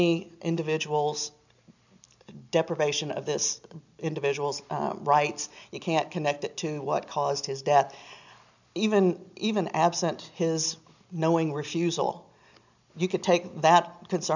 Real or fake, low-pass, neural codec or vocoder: real; 7.2 kHz; none